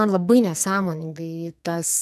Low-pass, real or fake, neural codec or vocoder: 14.4 kHz; fake; codec, 44.1 kHz, 2.6 kbps, SNAC